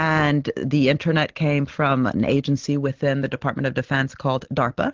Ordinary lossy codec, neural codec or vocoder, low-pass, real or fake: Opus, 16 kbps; none; 7.2 kHz; real